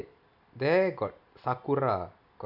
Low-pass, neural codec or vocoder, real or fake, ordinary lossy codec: 5.4 kHz; none; real; none